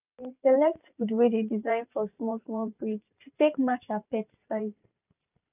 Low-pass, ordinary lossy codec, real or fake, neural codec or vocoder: 3.6 kHz; none; fake; vocoder, 44.1 kHz, 128 mel bands every 512 samples, BigVGAN v2